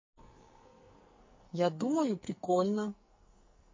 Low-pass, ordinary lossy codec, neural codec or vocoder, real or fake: 7.2 kHz; MP3, 32 kbps; codec, 44.1 kHz, 2.6 kbps, SNAC; fake